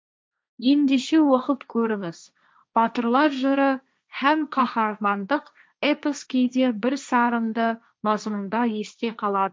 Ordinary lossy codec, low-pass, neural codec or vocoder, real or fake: none; none; codec, 16 kHz, 1.1 kbps, Voila-Tokenizer; fake